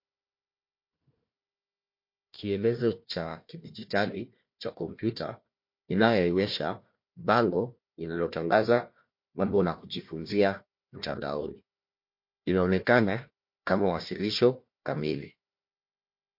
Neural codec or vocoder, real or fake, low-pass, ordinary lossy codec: codec, 16 kHz, 1 kbps, FunCodec, trained on Chinese and English, 50 frames a second; fake; 5.4 kHz; MP3, 32 kbps